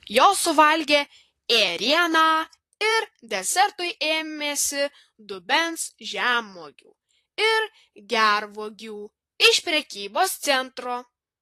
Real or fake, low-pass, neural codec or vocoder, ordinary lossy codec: fake; 14.4 kHz; vocoder, 44.1 kHz, 128 mel bands, Pupu-Vocoder; AAC, 48 kbps